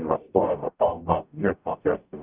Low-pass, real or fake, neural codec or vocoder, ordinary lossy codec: 3.6 kHz; fake; codec, 44.1 kHz, 0.9 kbps, DAC; Opus, 24 kbps